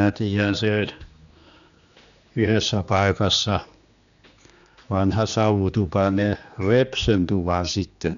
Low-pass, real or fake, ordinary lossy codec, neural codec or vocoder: 7.2 kHz; fake; none; codec, 16 kHz, 2 kbps, X-Codec, HuBERT features, trained on general audio